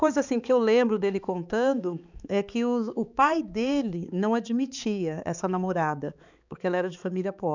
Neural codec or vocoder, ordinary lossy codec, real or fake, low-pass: codec, 16 kHz, 4 kbps, X-Codec, HuBERT features, trained on balanced general audio; none; fake; 7.2 kHz